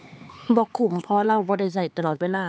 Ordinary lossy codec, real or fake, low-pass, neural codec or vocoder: none; fake; none; codec, 16 kHz, 4 kbps, X-Codec, HuBERT features, trained on LibriSpeech